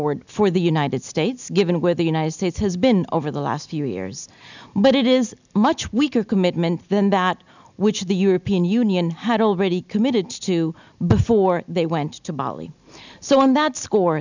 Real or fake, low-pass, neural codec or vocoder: real; 7.2 kHz; none